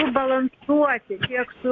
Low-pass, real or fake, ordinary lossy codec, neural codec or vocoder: 7.2 kHz; real; Opus, 64 kbps; none